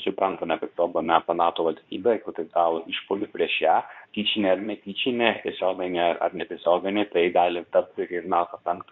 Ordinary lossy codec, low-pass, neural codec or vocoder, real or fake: MP3, 48 kbps; 7.2 kHz; codec, 24 kHz, 0.9 kbps, WavTokenizer, medium speech release version 2; fake